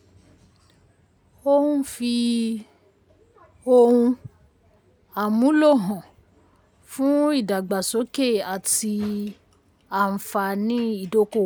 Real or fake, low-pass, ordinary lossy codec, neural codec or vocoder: real; none; none; none